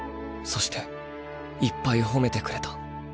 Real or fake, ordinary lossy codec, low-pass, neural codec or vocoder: real; none; none; none